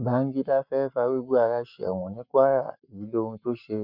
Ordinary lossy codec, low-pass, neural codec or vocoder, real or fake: none; 5.4 kHz; codec, 44.1 kHz, 7.8 kbps, Pupu-Codec; fake